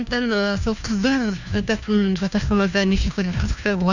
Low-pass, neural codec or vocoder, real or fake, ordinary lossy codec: 7.2 kHz; codec, 16 kHz, 1 kbps, FunCodec, trained on LibriTTS, 50 frames a second; fake; none